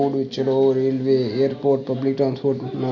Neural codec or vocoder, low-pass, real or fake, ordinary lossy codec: none; 7.2 kHz; real; none